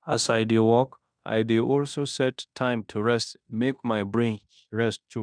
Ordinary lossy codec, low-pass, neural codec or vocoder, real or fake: none; 9.9 kHz; codec, 16 kHz in and 24 kHz out, 0.9 kbps, LongCat-Audio-Codec, fine tuned four codebook decoder; fake